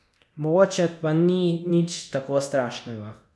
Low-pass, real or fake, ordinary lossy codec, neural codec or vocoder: none; fake; none; codec, 24 kHz, 0.9 kbps, DualCodec